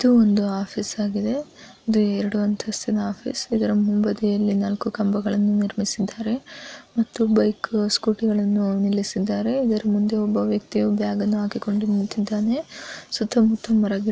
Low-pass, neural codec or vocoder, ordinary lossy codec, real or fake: none; none; none; real